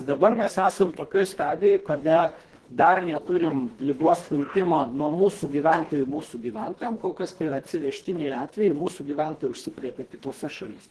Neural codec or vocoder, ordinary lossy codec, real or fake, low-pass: codec, 24 kHz, 1.5 kbps, HILCodec; Opus, 16 kbps; fake; 10.8 kHz